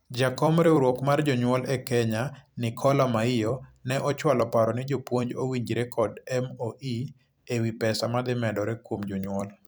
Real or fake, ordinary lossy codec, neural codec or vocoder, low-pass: fake; none; vocoder, 44.1 kHz, 128 mel bands every 256 samples, BigVGAN v2; none